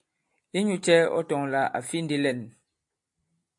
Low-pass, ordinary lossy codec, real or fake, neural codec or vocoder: 10.8 kHz; AAC, 64 kbps; real; none